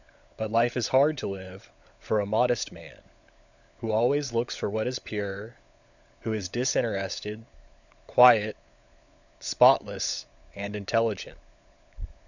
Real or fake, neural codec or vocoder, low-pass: fake; codec, 16 kHz, 16 kbps, FunCodec, trained on LibriTTS, 50 frames a second; 7.2 kHz